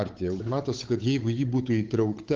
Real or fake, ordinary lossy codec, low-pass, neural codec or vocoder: fake; Opus, 32 kbps; 7.2 kHz; codec, 16 kHz, 8 kbps, FunCodec, trained on Chinese and English, 25 frames a second